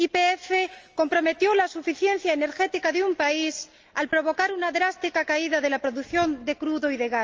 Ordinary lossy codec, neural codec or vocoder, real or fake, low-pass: Opus, 24 kbps; none; real; 7.2 kHz